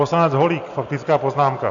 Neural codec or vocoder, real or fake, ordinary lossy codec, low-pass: none; real; AAC, 64 kbps; 7.2 kHz